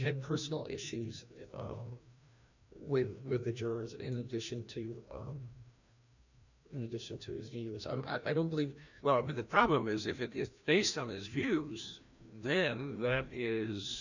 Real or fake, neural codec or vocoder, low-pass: fake; codec, 16 kHz, 1 kbps, FreqCodec, larger model; 7.2 kHz